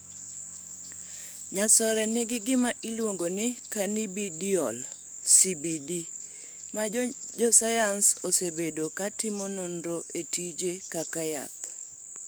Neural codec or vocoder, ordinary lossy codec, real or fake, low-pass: codec, 44.1 kHz, 7.8 kbps, DAC; none; fake; none